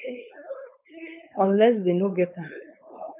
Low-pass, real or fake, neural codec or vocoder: 3.6 kHz; fake; codec, 16 kHz, 4.8 kbps, FACodec